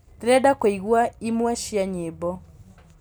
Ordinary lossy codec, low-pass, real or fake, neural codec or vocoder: none; none; real; none